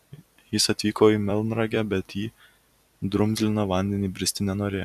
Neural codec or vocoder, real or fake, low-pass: vocoder, 44.1 kHz, 128 mel bands every 256 samples, BigVGAN v2; fake; 14.4 kHz